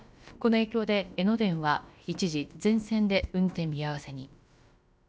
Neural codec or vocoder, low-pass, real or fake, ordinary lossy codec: codec, 16 kHz, about 1 kbps, DyCAST, with the encoder's durations; none; fake; none